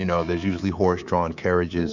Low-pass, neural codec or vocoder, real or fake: 7.2 kHz; codec, 24 kHz, 3.1 kbps, DualCodec; fake